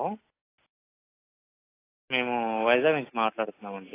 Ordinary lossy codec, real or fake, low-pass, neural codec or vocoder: AAC, 24 kbps; real; 3.6 kHz; none